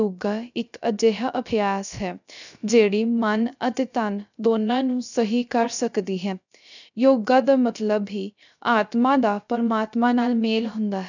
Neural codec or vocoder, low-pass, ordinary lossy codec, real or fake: codec, 16 kHz, 0.3 kbps, FocalCodec; 7.2 kHz; none; fake